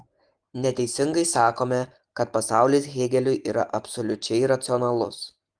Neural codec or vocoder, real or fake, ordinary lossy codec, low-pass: none; real; Opus, 24 kbps; 9.9 kHz